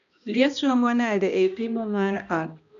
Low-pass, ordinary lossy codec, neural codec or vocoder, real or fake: 7.2 kHz; none; codec, 16 kHz, 1 kbps, X-Codec, HuBERT features, trained on balanced general audio; fake